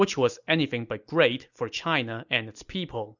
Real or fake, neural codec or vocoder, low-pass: real; none; 7.2 kHz